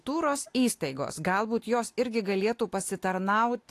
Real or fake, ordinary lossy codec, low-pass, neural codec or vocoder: real; AAC, 64 kbps; 14.4 kHz; none